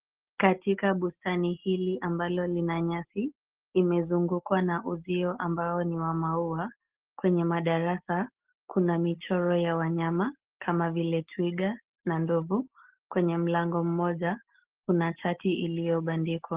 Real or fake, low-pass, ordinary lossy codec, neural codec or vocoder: real; 3.6 kHz; Opus, 16 kbps; none